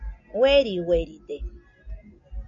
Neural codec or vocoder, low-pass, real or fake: none; 7.2 kHz; real